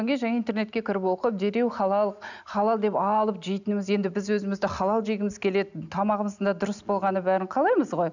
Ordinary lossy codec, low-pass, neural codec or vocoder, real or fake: none; 7.2 kHz; none; real